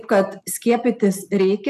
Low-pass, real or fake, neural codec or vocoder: 14.4 kHz; real; none